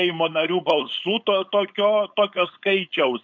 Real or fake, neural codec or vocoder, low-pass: fake; codec, 16 kHz, 4.8 kbps, FACodec; 7.2 kHz